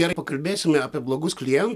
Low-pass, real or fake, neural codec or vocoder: 14.4 kHz; real; none